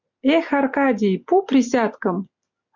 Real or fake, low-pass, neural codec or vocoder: real; 7.2 kHz; none